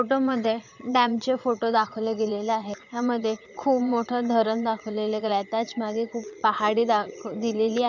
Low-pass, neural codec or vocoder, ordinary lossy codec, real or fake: 7.2 kHz; vocoder, 22.05 kHz, 80 mel bands, Vocos; none; fake